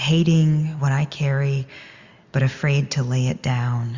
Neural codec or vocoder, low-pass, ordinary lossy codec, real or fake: none; 7.2 kHz; Opus, 64 kbps; real